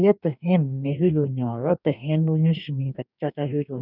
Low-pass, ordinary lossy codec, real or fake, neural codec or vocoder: 5.4 kHz; none; fake; codec, 44.1 kHz, 2.6 kbps, DAC